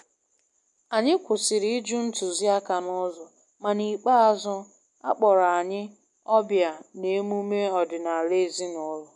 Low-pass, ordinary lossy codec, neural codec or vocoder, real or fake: 10.8 kHz; MP3, 96 kbps; none; real